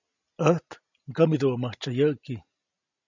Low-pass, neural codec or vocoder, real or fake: 7.2 kHz; none; real